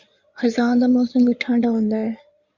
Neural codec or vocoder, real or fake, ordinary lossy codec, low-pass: codec, 16 kHz in and 24 kHz out, 2.2 kbps, FireRedTTS-2 codec; fake; Opus, 64 kbps; 7.2 kHz